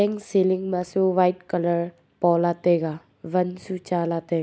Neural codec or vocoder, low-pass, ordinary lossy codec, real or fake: none; none; none; real